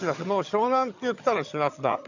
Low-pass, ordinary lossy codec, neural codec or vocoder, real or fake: 7.2 kHz; none; vocoder, 22.05 kHz, 80 mel bands, HiFi-GAN; fake